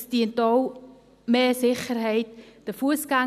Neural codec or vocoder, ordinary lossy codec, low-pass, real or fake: none; none; 14.4 kHz; real